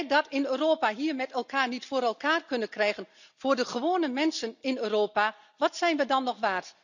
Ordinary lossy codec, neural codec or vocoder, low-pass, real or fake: none; none; 7.2 kHz; real